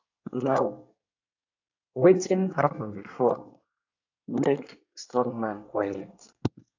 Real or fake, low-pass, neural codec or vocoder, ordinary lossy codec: fake; 7.2 kHz; codec, 24 kHz, 1 kbps, SNAC; AAC, 48 kbps